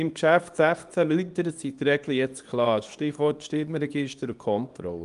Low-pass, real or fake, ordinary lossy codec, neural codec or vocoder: 10.8 kHz; fake; Opus, 32 kbps; codec, 24 kHz, 0.9 kbps, WavTokenizer, small release